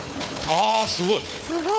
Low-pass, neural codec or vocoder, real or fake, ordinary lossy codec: none; codec, 16 kHz, 4 kbps, FunCodec, trained on Chinese and English, 50 frames a second; fake; none